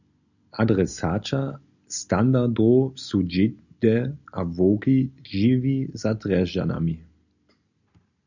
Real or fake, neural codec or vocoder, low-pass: real; none; 7.2 kHz